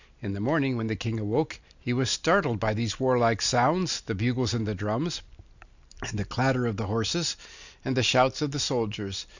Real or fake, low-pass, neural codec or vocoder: real; 7.2 kHz; none